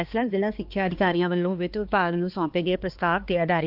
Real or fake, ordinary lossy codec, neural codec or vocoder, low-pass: fake; Opus, 24 kbps; codec, 16 kHz, 2 kbps, X-Codec, HuBERT features, trained on balanced general audio; 5.4 kHz